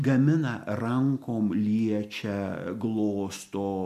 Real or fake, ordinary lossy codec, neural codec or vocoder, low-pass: real; MP3, 96 kbps; none; 14.4 kHz